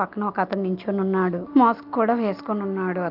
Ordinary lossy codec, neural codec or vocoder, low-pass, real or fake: Opus, 32 kbps; none; 5.4 kHz; real